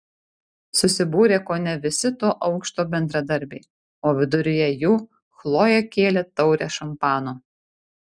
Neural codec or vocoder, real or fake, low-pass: none; real; 9.9 kHz